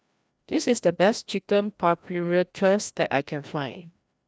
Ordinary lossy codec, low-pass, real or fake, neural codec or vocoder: none; none; fake; codec, 16 kHz, 1 kbps, FreqCodec, larger model